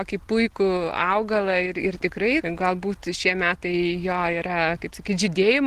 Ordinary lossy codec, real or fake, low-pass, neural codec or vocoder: Opus, 16 kbps; real; 14.4 kHz; none